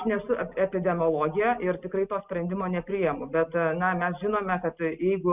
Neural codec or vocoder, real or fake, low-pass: none; real; 3.6 kHz